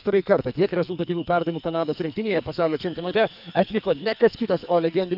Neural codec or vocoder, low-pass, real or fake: codec, 44.1 kHz, 2.6 kbps, SNAC; 5.4 kHz; fake